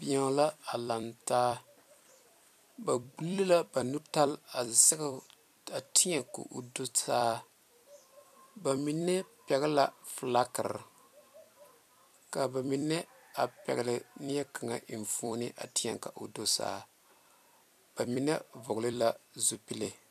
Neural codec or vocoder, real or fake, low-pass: vocoder, 44.1 kHz, 128 mel bands every 256 samples, BigVGAN v2; fake; 14.4 kHz